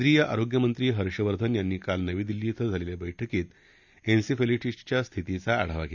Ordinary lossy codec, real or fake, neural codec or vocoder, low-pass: none; real; none; 7.2 kHz